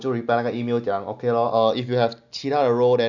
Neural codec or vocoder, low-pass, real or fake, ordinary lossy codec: none; 7.2 kHz; real; none